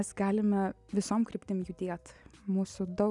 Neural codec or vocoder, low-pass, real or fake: none; 10.8 kHz; real